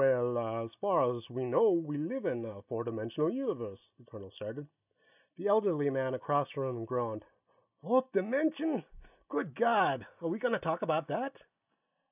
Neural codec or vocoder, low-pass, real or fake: none; 3.6 kHz; real